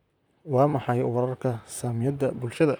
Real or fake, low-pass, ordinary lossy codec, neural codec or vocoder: fake; none; none; vocoder, 44.1 kHz, 128 mel bands, Pupu-Vocoder